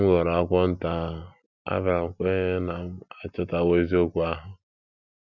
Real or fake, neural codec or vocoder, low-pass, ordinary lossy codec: real; none; 7.2 kHz; none